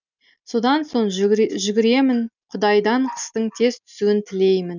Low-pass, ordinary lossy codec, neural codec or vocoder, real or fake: 7.2 kHz; none; none; real